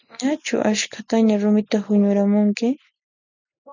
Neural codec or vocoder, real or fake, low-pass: none; real; 7.2 kHz